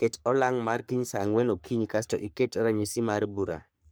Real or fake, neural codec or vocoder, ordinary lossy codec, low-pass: fake; codec, 44.1 kHz, 3.4 kbps, Pupu-Codec; none; none